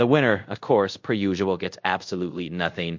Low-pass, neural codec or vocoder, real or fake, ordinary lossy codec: 7.2 kHz; codec, 24 kHz, 0.5 kbps, DualCodec; fake; MP3, 48 kbps